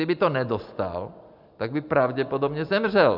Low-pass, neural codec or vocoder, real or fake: 5.4 kHz; none; real